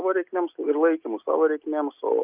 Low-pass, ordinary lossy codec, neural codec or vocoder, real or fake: 3.6 kHz; Opus, 24 kbps; none; real